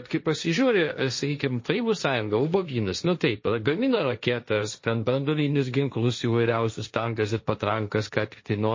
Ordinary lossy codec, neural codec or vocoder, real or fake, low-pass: MP3, 32 kbps; codec, 16 kHz, 1.1 kbps, Voila-Tokenizer; fake; 7.2 kHz